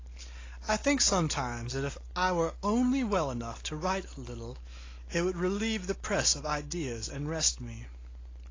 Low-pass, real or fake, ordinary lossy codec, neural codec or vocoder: 7.2 kHz; real; AAC, 32 kbps; none